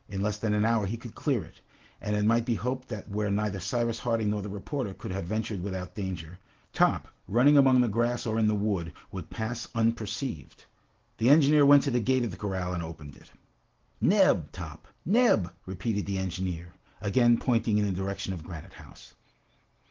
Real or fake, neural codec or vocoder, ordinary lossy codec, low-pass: real; none; Opus, 16 kbps; 7.2 kHz